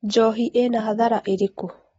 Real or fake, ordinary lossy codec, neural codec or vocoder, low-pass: real; AAC, 24 kbps; none; 14.4 kHz